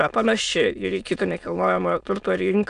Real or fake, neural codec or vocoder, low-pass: fake; autoencoder, 22.05 kHz, a latent of 192 numbers a frame, VITS, trained on many speakers; 9.9 kHz